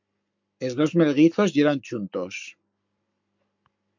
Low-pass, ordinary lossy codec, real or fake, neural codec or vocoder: 7.2 kHz; MP3, 64 kbps; fake; codec, 44.1 kHz, 7.8 kbps, Pupu-Codec